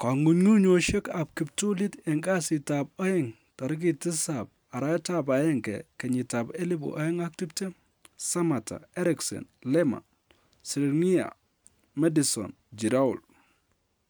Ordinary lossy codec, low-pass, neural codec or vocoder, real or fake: none; none; none; real